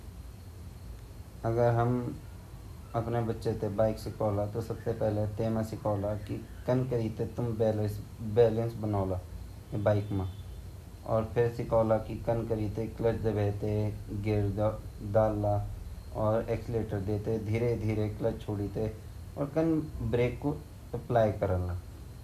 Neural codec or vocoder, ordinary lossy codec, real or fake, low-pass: none; MP3, 96 kbps; real; 14.4 kHz